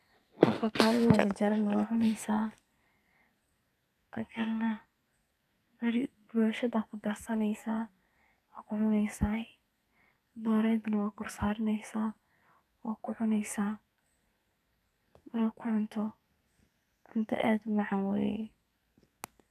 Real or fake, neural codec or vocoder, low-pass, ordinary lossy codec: fake; codec, 44.1 kHz, 2.6 kbps, SNAC; 14.4 kHz; none